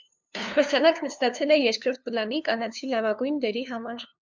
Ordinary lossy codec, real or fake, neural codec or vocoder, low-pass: MP3, 64 kbps; fake; codec, 16 kHz, 2 kbps, FunCodec, trained on LibriTTS, 25 frames a second; 7.2 kHz